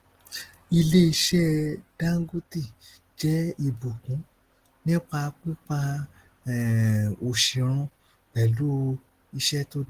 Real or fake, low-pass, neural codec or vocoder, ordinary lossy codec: real; 14.4 kHz; none; Opus, 16 kbps